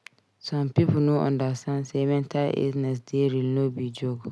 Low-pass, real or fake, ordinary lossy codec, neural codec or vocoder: none; real; none; none